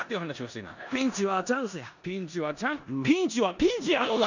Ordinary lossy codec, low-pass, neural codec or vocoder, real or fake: none; 7.2 kHz; codec, 16 kHz in and 24 kHz out, 0.9 kbps, LongCat-Audio-Codec, four codebook decoder; fake